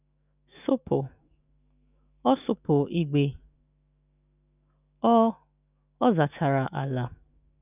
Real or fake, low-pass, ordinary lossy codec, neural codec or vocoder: fake; 3.6 kHz; none; autoencoder, 48 kHz, 128 numbers a frame, DAC-VAE, trained on Japanese speech